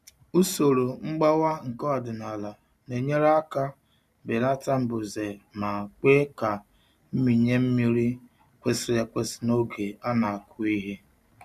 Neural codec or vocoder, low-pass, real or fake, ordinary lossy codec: none; 14.4 kHz; real; none